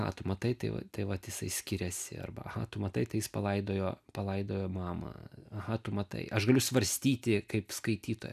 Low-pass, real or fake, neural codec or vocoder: 14.4 kHz; real; none